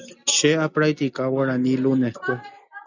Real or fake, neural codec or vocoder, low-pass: real; none; 7.2 kHz